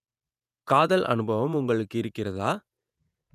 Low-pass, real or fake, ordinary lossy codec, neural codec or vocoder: 14.4 kHz; fake; none; vocoder, 48 kHz, 128 mel bands, Vocos